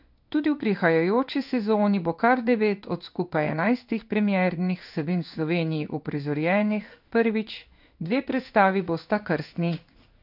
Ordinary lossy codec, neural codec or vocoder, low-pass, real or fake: none; codec, 16 kHz in and 24 kHz out, 1 kbps, XY-Tokenizer; 5.4 kHz; fake